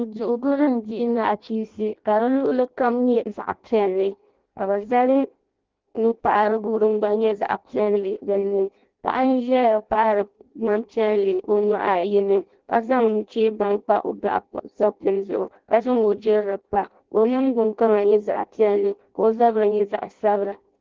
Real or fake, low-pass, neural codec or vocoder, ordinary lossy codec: fake; 7.2 kHz; codec, 16 kHz in and 24 kHz out, 0.6 kbps, FireRedTTS-2 codec; Opus, 16 kbps